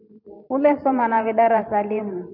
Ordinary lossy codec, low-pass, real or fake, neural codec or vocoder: AAC, 48 kbps; 5.4 kHz; fake; vocoder, 44.1 kHz, 128 mel bands every 512 samples, BigVGAN v2